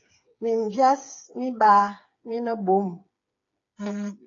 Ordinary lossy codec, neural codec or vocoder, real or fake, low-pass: AAC, 32 kbps; codec, 16 kHz, 8 kbps, FreqCodec, smaller model; fake; 7.2 kHz